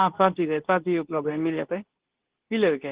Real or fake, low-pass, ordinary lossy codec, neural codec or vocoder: fake; 3.6 kHz; Opus, 16 kbps; codec, 24 kHz, 0.9 kbps, WavTokenizer, medium speech release version 2